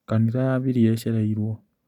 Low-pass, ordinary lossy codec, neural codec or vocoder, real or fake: 19.8 kHz; none; none; real